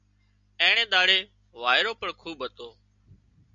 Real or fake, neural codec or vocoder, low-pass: real; none; 7.2 kHz